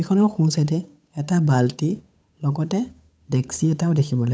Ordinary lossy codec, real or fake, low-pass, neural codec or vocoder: none; fake; none; codec, 16 kHz, 8 kbps, FunCodec, trained on Chinese and English, 25 frames a second